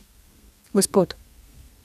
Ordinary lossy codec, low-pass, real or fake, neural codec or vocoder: none; 14.4 kHz; fake; codec, 32 kHz, 1.9 kbps, SNAC